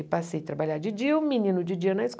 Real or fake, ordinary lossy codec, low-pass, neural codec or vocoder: real; none; none; none